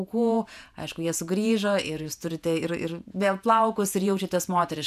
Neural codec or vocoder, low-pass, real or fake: vocoder, 48 kHz, 128 mel bands, Vocos; 14.4 kHz; fake